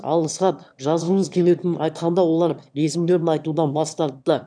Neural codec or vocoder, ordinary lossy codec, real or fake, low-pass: autoencoder, 22.05 kHz, a latent of 192 numbers a frame, VITS, trained on one speaker; none; fake; 9.9 kHz